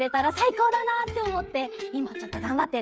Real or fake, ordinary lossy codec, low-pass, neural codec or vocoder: fake; none; none; codec, 16 kHz, 8 kbps, FreqCodec, smaller model